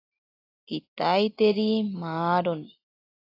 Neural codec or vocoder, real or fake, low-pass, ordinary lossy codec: none; real; 5.4 kHz; AAC, 24 kbps